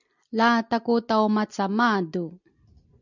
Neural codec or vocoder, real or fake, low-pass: none; real; 7.2 kHz